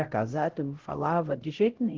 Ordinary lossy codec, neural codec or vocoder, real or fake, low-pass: Opus, 16 kbps; codec, 16 kHz, 0.5 kbps, X-Codec, HuBERT features, trained on LibriSpeech; fake; 7.2 kHz